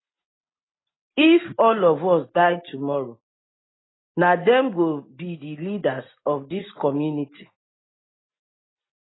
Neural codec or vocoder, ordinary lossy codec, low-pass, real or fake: none; AAC, 16 kbps; 7.2 kHz; real